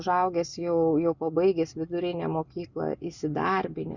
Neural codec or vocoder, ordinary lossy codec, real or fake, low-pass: none; Opus, 64 kbps; real; 7.2 kHz